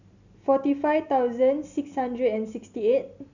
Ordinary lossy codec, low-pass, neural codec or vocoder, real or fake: none; 7.2 kHz; none; real